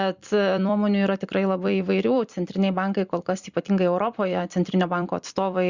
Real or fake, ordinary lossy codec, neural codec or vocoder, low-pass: fake; Opus, 64 kbps; vocoder, 44.1 kHz, 128 mel bands every 256 samples, BigVGAN v2; 7.2 kHz